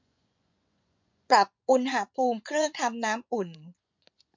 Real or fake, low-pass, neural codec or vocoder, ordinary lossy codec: fake; 7.2 kHz; vocoder, 24 kHz, 100 mel bands, Vocos; MP3, 48 kbps